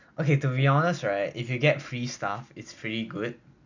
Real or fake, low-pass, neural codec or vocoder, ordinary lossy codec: real; 7.2 kHz; none; none